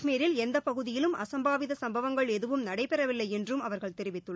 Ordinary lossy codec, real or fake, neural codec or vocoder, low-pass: none; real; none; none